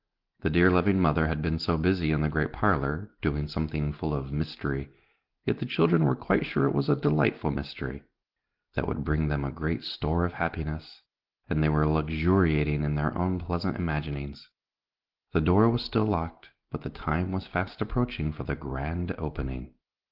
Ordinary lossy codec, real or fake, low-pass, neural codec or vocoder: Opus, 16 kbps; real; 5.4 kHz; none